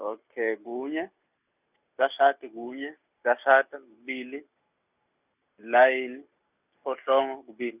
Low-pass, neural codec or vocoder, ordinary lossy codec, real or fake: 3.6 kHz; none; none; real